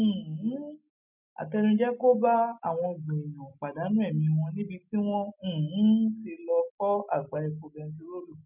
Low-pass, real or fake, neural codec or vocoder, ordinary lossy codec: 3.6 kHz; real; none; none